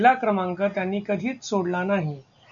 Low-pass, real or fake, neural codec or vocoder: 7.2 kHz; real; none